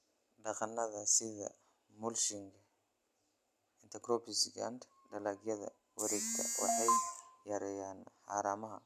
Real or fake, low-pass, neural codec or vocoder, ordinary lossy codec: real; 14.4 kHz; none; none